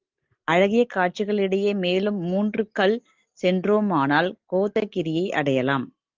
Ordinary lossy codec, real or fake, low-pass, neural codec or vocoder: Opus, 24 kbps; real; 7.2 kHz; none